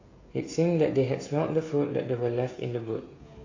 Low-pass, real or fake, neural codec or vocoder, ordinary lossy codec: 7.2 kHz; fake; codec, 16 kHz, 16 kbps, FreqCodec, smaller model; AAC, 32 kbps